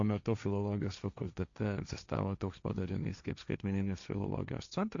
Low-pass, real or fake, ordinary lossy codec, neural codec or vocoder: 7.2 kHz; fake; MP3, 64 kbps; codec, 16 kHz, 1.1 kbps, Voila-Tokenizer